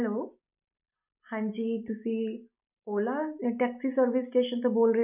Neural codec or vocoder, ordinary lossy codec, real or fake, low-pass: none; none; real; 3.6 kHz